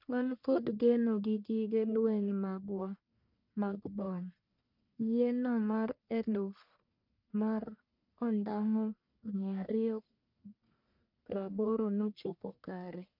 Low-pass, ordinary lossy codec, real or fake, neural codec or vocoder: 5.4 kHz; none; fake; codec, 44.1 kHz, 1.7 kbps, Pupu-Codec